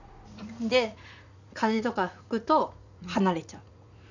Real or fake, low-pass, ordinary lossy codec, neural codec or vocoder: fake; 7.2 kHz; none; vocoder, 44.1 kHz, 80 mel bands, Vocos